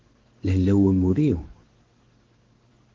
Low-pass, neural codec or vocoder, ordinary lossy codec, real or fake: 7.2 kHz; codec, 16 kHz in and 24 kHz out, 1 kbps, XY-Tokenizer; Opus, 16 kbps; fake